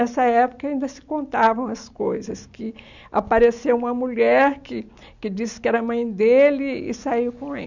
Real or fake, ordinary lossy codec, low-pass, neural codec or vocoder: real; none; 7.2 kHz; none